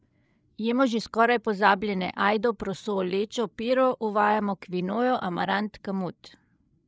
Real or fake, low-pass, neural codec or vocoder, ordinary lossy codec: fake; none; codec, 16 kHz, 8 kbps, FreqCodec, larger model; none